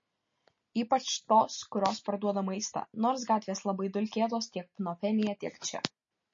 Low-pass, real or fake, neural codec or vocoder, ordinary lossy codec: 7.2 kHz; real; none; MP3, 32 kbps